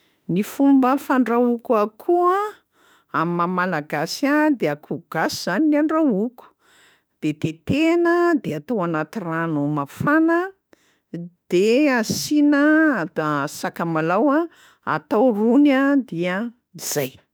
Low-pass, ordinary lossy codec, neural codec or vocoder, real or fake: none; none; autoencoder, 48 kHz, 32 numbers a frame, DAC-VAE, trained on Japanese speech; fake